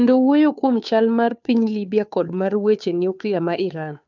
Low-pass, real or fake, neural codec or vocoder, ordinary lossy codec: 7.2 kHz; fake; codec, 16 kHz, 2 kbps, FunCodec, trained on Chinese and English, 25 frames a second; none